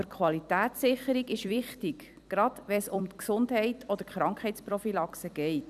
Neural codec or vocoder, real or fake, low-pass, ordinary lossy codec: vocoder, 44.1 kHz, 128 mel bands every 256 samples, BigVGAN v2; fake; 14.4 kHz; none